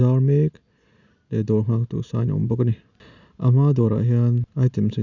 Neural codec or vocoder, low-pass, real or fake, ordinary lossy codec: none; 7.2 kHz; real; none